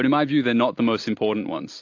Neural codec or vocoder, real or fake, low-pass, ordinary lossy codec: none; real; 7.2 kHz; AAC, 48 kbps